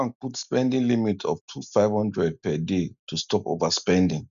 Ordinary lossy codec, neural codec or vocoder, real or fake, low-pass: none; none; real; 7.2 kHz